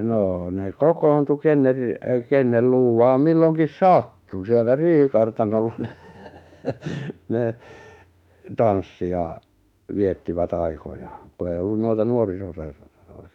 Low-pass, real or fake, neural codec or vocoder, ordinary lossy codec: 19.8 kHz; fake; autoencoder, 48 kHz, 32 numbers a frame, DAC-VAE, trained on Japanese speech; none